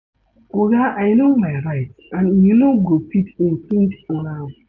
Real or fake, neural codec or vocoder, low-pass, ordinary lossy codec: real; none; 7.2 kHz; none